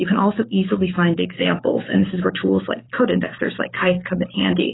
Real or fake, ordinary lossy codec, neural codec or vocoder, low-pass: fake; AAC, 16 kbps; codec, 44.1 kHz, 7.8 kbps, DAC; 7.2 kHz